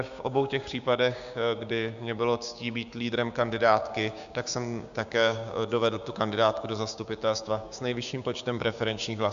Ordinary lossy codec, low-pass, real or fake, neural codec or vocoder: AAC, 96 kbps; 7.2 kHz; fake; codec, 16 kHz, 6 kbps, DAC